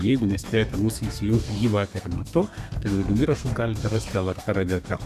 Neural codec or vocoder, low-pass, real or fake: codec, 32 kHz, 1.9 kbps, SNAC; 14.4 kHz; fake